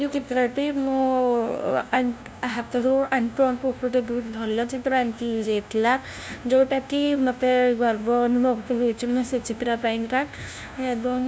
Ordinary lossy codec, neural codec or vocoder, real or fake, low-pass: none; codec, 16 kHz, 0.5 kbps, FunCodec, trained on LibriTTS, 25 frames a second; fake; none